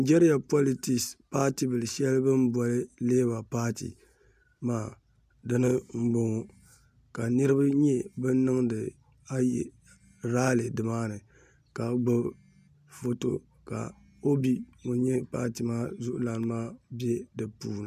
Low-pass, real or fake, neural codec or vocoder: 14.4 kHz; real; none